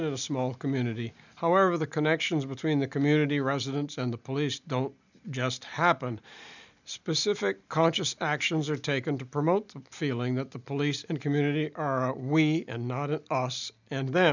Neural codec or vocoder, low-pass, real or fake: none; 7.2 kHz; real